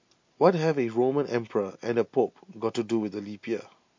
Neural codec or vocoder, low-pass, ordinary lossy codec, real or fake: none; 7.2 kHz; MP3, 48 kbps; real